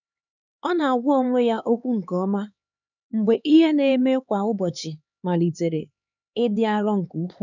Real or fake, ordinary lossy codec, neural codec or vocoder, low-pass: fake; none; codec, 16 kHz, 4 kbps, X-Codec, HuBERT features, trained on LibriSpeech; 7.2 kHz